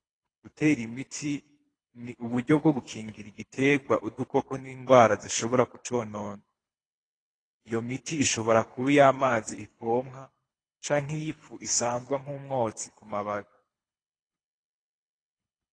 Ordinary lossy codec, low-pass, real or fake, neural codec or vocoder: AAC, 32 kbps; 9.9 kHz; fake; codec, 24 kHz, 3 kbps, HILCodec